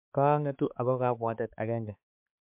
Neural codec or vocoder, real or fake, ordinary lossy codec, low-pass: codec, 16 kHz, 4 kbps, X-Codec, HuBERT features, trained on balanced general audio; fake; MP3, 32 kbps; 3.6 kHz